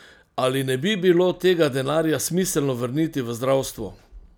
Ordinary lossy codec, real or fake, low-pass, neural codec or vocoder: none; real; none; none